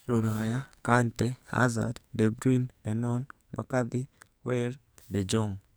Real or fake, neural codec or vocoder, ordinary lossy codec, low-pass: fake; codec, 44.1 kHz, 2.6 kbps, SNAC; none; none